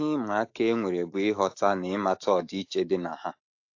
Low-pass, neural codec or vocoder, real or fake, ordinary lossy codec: 7.2 kHz; autoencoder, 48 kHz, 128 numbers a frame, DAC-VAE, trained on Japanese speech; fake; AAC, 48 kbps